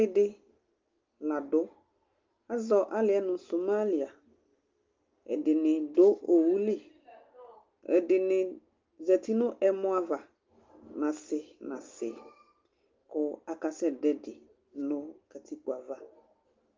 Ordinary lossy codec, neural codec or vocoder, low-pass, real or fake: Opus, 24 kbps; none; 7.2 kHz; real